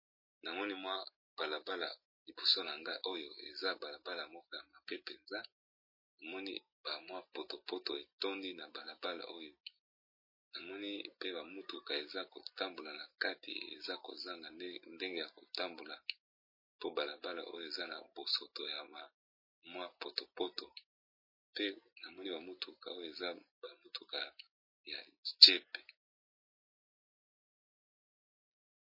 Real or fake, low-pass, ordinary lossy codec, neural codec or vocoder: real; 5.4 kHz; MP3, 24 kbps; none